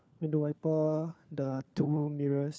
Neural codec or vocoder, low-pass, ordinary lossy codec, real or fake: codec, 16 kHz, 4 kbps, FunCodec, trained on LibriTTS, 50 frames a second; none; none; fake